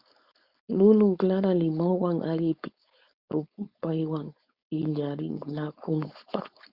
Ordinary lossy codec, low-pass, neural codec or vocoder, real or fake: Opus, 64 kbps; 5.4 kHz; codec, 16 kHz, 4.8 kbps, FACodec; fake